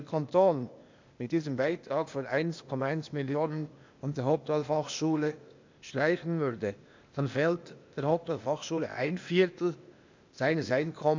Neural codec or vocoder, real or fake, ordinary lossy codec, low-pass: codec, 16 kHz, 0.8 kbps, ZipCodec; fake; MP3, 64 kbps; 7.2 kHz